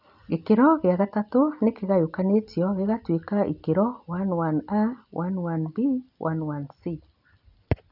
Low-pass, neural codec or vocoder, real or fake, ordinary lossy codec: 5.4 kHz; none; real; none